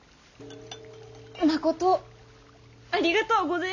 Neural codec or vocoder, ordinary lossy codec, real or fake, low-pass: none; none; real; 7.2 kHz